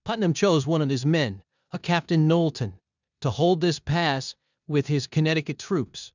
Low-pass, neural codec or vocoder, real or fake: 7.2 kHz; codec, 16 kHz in and 24 kHz out, 0.9 kbps, LongCat-Audio-Codec, four codebook decoder; fake